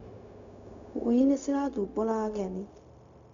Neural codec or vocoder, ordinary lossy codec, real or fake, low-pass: codec, 16 kHz, 0.4 kbps, LongCat-Audio-Codec; none; fake; 7.2 kHz